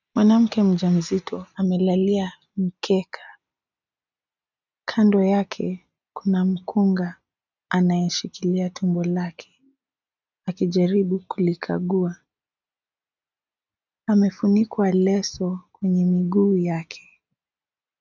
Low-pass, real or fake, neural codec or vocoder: 7.2 kHz; real; none